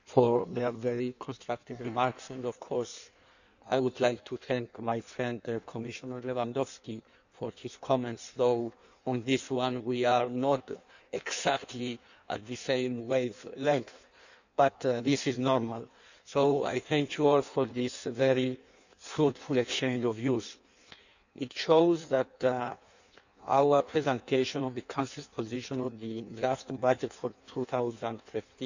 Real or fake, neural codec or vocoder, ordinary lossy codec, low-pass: fake; codec, 16 kHz in and 24 kHz out, 1.1 kbps, FireRedTTS-2 codec; none; 7.2 kHz